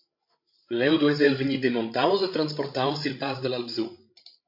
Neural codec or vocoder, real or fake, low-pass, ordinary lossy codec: codec, 16 kHz, 16 kbps, FreqCodec, larger model; fake; 5.4 kHz; MP3, 32 kbps